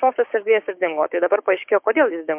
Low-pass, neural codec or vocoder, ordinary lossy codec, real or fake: 3.6 kHz; codec, 16 kHz, 6 kbps, DAC; MP3, 32 kbps; fake